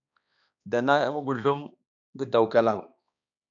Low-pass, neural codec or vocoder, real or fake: 7.2 kHz; codec, 16 kHz, 2 kbps, X-Codec, HuBERT features, trained on balanced general audio; fake